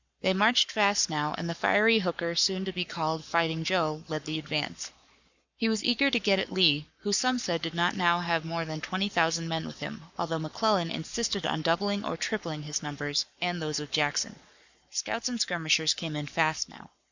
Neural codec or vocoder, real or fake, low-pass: codec, 44.1 kHz, 7.8 kbps, Pupu-Codec; fake; 7.2 kHz